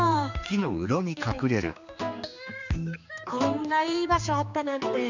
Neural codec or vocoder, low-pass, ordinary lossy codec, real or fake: codec, 16 kHz, 2 kbps, X-Codec, HuBERT features, trained on general audio; 7.2 kHz; none; fake